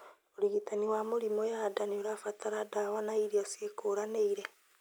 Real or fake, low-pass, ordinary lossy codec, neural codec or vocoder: real; none; none; none